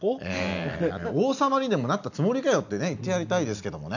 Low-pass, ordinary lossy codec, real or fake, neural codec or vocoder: 7.2 kHz; none; real; none